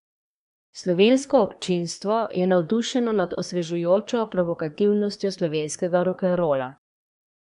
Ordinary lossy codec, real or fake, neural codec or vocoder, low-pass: none; fake; codec, 24 kHz, 1 kbps, SNAC; 10.8 kHz